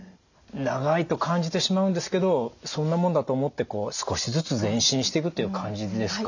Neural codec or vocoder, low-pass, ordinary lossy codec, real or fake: none; 7.2 kHz; none; real